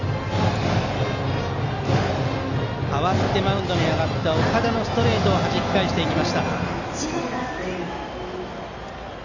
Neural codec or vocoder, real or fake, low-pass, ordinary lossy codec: none; real; 7.2 kHz; none